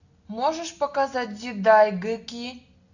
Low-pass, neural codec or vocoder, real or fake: 7.2 kHz; none; real